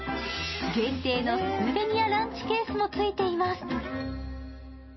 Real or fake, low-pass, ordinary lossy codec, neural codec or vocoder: real; 7.2 kHz; MP3, 24 kbps; none